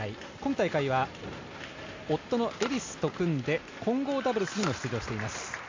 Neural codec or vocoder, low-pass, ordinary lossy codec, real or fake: none; 7.2 kHz; MP3, 64 kbps; real